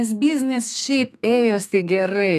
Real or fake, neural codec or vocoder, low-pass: fake; codec, 32 kHz, 1.9 kbps, SNAC; 14.4 kHz